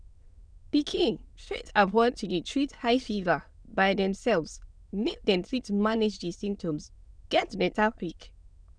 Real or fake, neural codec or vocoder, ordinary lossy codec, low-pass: fake; autoencoder, 22.05 kHz, a latent of 192 numbers a frame, VITS, trained on many speakers; none; 9.9 kHz